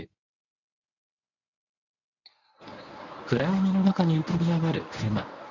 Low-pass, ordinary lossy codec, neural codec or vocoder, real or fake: 7.2 kHz; none; codec, 24 kHz, 0.9 kbps, WavTokenizer, medium speech release version 1; fake